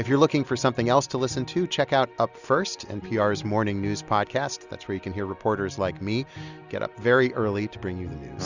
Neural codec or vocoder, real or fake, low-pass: none; real; 7.2 kHz